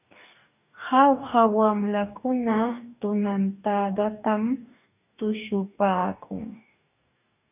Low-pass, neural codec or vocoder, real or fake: 3.6 kHz; codec, 44.1 kHz, 2.6 kbps, DAC; fake